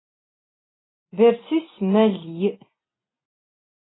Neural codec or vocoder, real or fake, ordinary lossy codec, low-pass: none; real; AAC, 16 kbps; 7.2 kHz